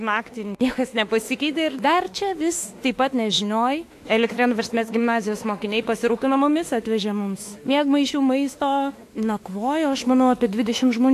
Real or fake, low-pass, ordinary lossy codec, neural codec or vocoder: fake; 14.4 kHz; AAC, 64 kbps; autoencoder, 48 kHz, 32 numbers a frame, DAC-VAE, trained on Japanese speech